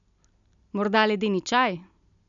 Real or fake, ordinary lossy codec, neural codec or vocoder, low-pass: real; none; none; 7.2 kHz